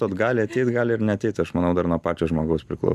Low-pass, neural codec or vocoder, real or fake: 14.4 kHz; none; real